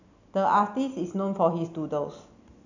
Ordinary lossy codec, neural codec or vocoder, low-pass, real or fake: none; none; 7.2 kHz; real